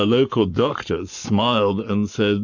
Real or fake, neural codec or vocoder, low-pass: fake; codec, 44.1 kHz, 7.8 kbps, Pupu-Codec; 7.2 kHz